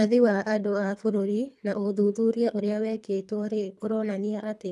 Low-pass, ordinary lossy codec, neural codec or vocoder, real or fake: none; none; codec, 24 kHz, 3 kbps, HILCodec; fake